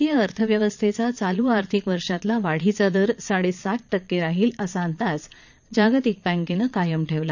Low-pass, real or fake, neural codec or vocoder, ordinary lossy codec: 7.2 kHz; fake; vocoder, 22.05 kHz, 80 mel bands, Vocos; none